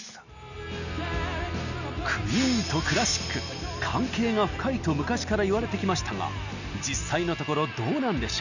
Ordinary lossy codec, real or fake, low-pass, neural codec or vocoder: none; real; 7.2 kHz; none